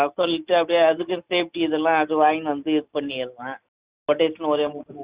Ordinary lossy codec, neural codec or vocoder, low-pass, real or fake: Opus, 64 kbps; none; 3.6 kHz; real